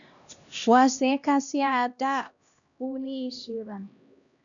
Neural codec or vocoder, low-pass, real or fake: codec, 16 kHz, 1 kbps, X-Codec, HuBERT features, trained on LibriSpeech; 7.2 kHz; fake